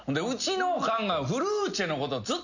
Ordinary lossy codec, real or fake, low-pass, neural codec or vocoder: none; real; 7.2 kHz; none